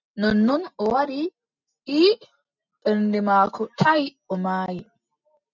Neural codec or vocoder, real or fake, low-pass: none; real; 7.2 kHz